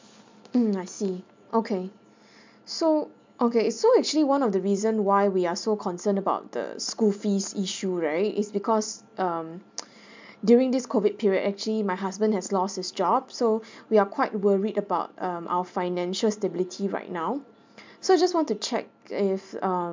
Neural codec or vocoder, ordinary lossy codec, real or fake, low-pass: none; MP3, 64 kbps; real; 7.2 kHz